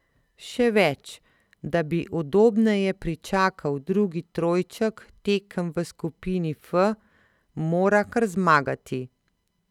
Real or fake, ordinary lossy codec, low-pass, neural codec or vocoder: real; none; 19.8 kHz; none